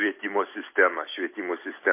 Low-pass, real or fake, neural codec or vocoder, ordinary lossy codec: 3.6 kHz; real; none; MP3, 24 kbps